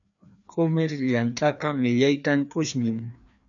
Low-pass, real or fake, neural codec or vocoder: 7.2 kHz; fake; codec, 16 kHz, 2 kbps, FreqCodec, larger model